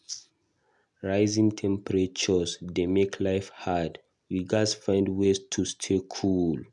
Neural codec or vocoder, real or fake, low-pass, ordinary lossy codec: none; real; 10.8 kHz; none